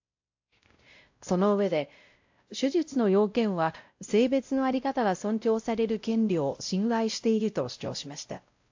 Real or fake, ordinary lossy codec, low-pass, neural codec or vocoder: fake; AAC, 48 kbps; 7.2 kHz; codec, 16 kHz, 0.5 kbps, X-Codec, WavLM features, trained on Multilingual LibriSpeech